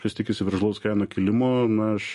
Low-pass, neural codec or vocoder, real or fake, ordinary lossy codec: 14.4 kHz; none; real; MP3, 48 kbps